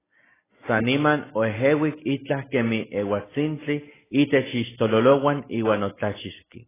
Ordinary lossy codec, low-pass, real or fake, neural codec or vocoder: AAC, 16 kbps; 3.6 kHz; real; none